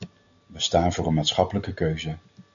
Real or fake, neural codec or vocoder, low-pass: real; none; 7.2 kHz